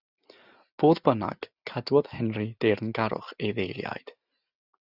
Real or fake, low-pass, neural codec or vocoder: real; 5.4 kHz; none